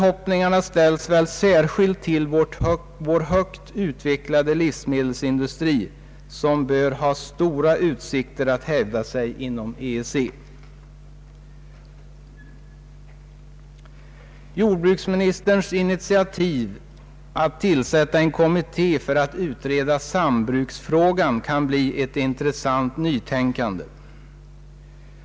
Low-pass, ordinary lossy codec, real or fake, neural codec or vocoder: none; none; real; none